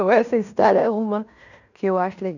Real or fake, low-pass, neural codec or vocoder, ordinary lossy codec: fake; 7.2 kHz; codec, 16 kHz in and 24 kHz out, 0.9 kbps, LongCat-Audio-Codec, fine tuned four codebook decoder; none